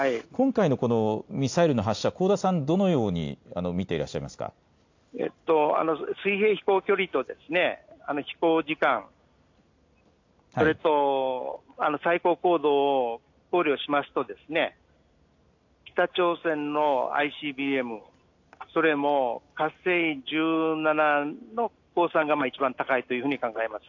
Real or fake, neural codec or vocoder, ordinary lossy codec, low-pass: real; none; AAC, 48 kbps; 7.2 kHz